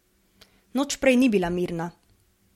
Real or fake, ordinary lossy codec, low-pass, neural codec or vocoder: real; MP3, 64 kbps; 19.8 kHz; none